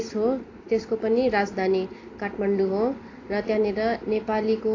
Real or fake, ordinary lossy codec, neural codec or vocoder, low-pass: real; AAC, 32 kbps; none; 7.2 kHz